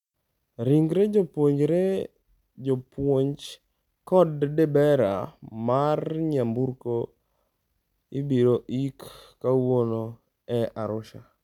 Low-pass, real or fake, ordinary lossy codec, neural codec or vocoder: 19.8 kHz; real; none; none